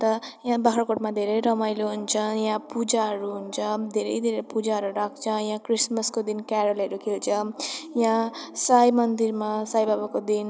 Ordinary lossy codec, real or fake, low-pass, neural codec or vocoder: none; real; none; none